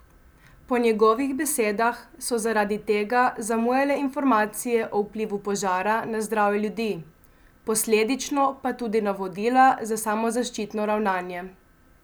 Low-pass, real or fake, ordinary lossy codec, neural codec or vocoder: none; real; none; none